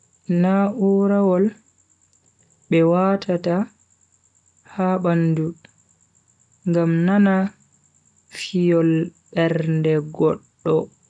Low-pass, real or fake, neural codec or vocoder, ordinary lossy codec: 9.9 kHz; real; none; none